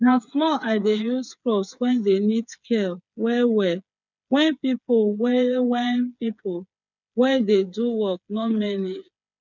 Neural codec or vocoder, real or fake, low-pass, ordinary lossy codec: codec, 16 kHz, 8 kbps, FreqCodec, smaller model; fake; 7.2 kHz; none